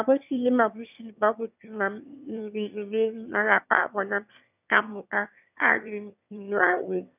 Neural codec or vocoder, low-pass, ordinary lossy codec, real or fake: autoencoder, 22.05 kHz, a latent of 192 numbers a frame, VITS, trained on one speaker; 3.6 kHz; none; fake